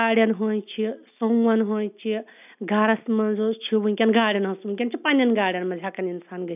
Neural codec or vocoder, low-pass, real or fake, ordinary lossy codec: none; 3.6 kHz; real; none